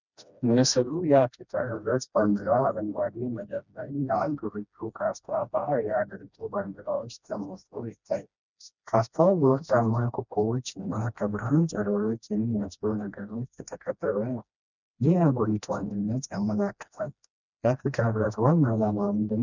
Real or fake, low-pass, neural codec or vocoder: fake; 7.2 kHz; codec, 16 kHz, 1 kbps, FreqCodec, smaller model